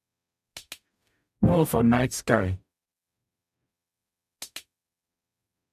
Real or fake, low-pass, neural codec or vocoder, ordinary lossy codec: fake; 14.4 kHz; codec, 44.1 kHz, 0.9 kbps, DAC; none